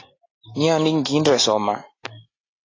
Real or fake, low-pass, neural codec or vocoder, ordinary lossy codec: real; 7.2 kHz; none; AAC, 32 kbps